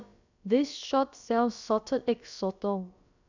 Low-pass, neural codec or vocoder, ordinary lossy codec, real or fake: 7.2 kHz; codec, 16 kHz, about 1 kbps, DyCAST, with the encoder's durations; none; fake